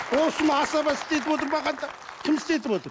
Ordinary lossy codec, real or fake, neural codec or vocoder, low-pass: none; real; none; none